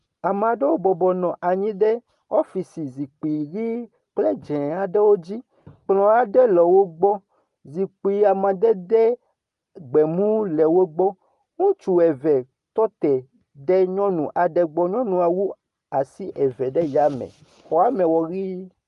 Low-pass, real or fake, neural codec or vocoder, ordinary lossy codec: 10.8 kHz; real; none; Opus, 32 kbps